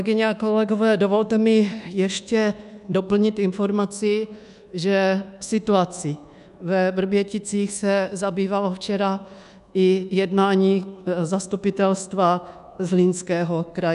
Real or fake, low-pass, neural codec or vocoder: fake; 10.8 kHz; codec, 24 kHz, 1.2 kbps, DualCodec